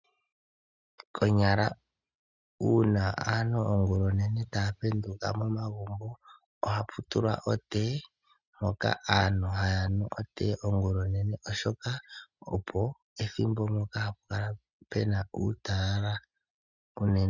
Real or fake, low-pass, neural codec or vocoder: real; 7.2 kHz; none